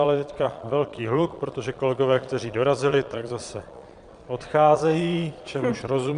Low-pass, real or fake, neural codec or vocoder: 9.9 kHz; fake; vocoder, 22.05 kHz, 80 mel bands, Vocos